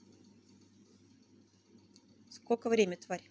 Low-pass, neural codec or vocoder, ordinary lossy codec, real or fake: none; none; none; real